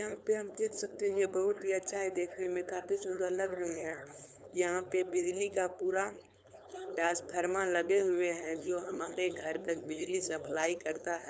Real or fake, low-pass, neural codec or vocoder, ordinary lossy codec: fake; none; codec, 16 kHz, 4.8 kbps, FACodec; none